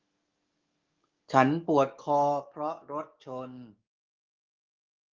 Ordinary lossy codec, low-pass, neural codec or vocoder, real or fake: Opus, 24 kbps; 7.2 kHz; codec, 44.1 kHz, 7.8 kbps, DAC; fake